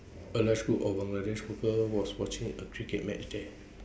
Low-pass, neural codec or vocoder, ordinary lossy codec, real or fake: none; none; none; real